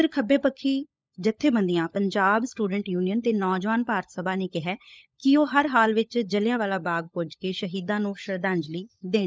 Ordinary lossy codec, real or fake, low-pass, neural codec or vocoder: none; fake; none; codec, 16 kHz, 16 kbps, FunCodec, trained on LibriTTS, 50 frames a second